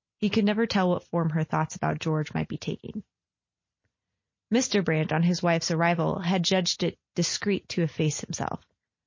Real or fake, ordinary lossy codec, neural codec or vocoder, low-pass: real; MP3, 32 kbps; none; 7.2 kHz